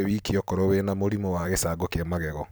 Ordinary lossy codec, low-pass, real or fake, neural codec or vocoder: none; none; fake; vocoder, 44.1 kHz, 128 mel bands every 256 samples, BigVGAN v2